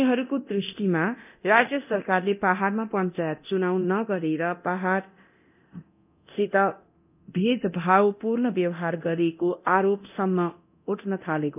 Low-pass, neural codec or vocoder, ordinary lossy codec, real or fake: 3.6 kHz; codec, 24 kHz, 0.9 kbps, DualCodec; none; fake